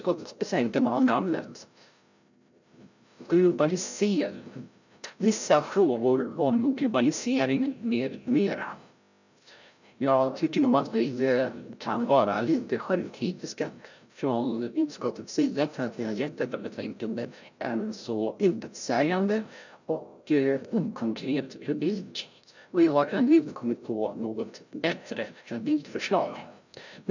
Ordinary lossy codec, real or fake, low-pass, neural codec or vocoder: none; fake; 7.2 kHz; codec, 16 kHz, 0.5 kbps, FreqCodec, larger model